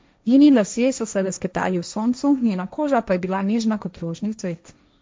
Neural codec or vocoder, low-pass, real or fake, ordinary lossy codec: codec, 16 kHz, 1.1 kbps, Voila-Tokenizer; none; fake; none